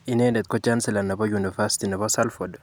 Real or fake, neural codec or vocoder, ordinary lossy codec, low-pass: real; none; none; none